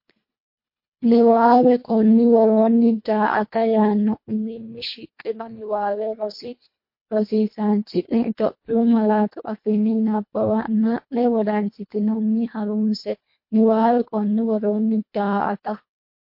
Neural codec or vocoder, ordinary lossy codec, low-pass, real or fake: codec, 24 kHz, 1.5 kbps, HILCodec; MP3, 32 kbps; 5.4 kHz; fake